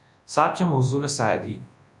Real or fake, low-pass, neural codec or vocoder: fake; 10.8 kHz; codec, 24 kHz, 0.9 kbps, WavTokenizer, large speech release